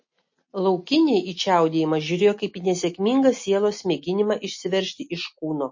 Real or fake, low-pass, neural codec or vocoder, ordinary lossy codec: real; 7.2 kHz; none; MP3, 32 kbps